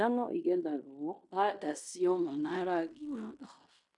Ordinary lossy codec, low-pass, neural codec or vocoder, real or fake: none; 10.8 kHz; codec, 16 kHz in and 24 kHz out, 0.9 kbps, LongCat-Audio-Codec, fine tuned four codebook decoder; fake